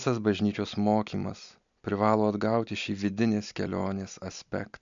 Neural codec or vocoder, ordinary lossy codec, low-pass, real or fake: none; MP3, 64 kbps; 7.2 kHz; real